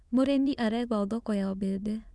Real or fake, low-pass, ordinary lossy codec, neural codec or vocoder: fake; none; none; autoencoder, 22.05 kHz, a latent of 192 numbers a frame, VITS, trained on many speakers